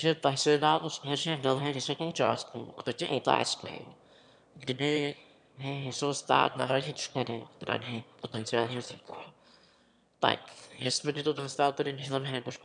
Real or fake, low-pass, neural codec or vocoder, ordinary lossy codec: fake; 9.9 kHz; autoencoder, 22.05 kHz, a latent of 192 numbers a frame, VITS, trained on one speaker; MP3, 96 kbps